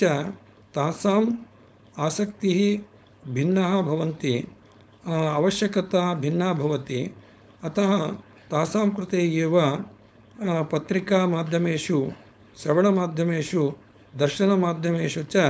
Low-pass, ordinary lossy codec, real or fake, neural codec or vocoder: none; none; fake; codec, 16 kHz, 4.8 kbps, FACodec